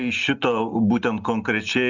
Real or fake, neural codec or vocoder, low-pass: real; none; 7.2 kHz